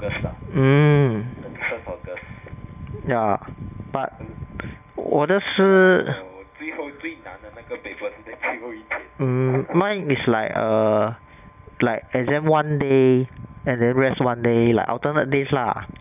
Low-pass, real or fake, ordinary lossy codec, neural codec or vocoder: 3.6 kHz; real; none; none